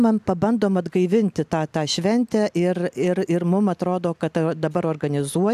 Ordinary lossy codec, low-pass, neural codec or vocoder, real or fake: AAC, 96 kbps; 14.4 kHz; none; real